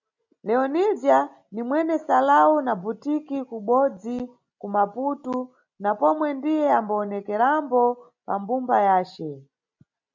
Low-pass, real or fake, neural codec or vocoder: 7.2 kHz; real; none